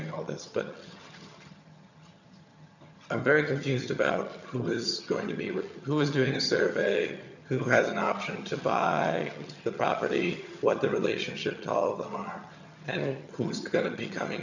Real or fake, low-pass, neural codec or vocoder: fake; 7.2 kHz; vocoder, 22.05 kHz, 80 mel bands, HiFi-GAN